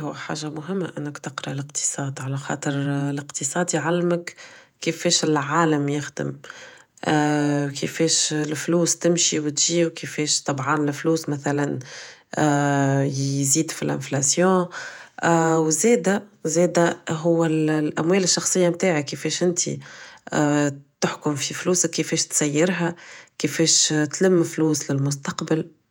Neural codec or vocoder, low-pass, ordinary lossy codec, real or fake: vocoder, 48 kHz, 128 mel bands, Vocos; 19.8 kHz; none; fake